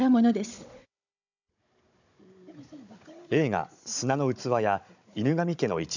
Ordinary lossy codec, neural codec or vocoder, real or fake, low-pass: none; codec, 16 kHz, 16 kbps, FunCodec, trained on Chinese and English, 50 frames a second; fake; 7.2 kHz